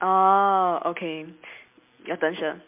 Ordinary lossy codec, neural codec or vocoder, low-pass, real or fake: MP3, 32 kbps; none; 3.6 kHz; real